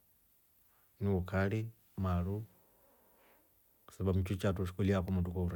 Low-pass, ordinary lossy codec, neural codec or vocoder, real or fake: 19.8 kHz; none; none; real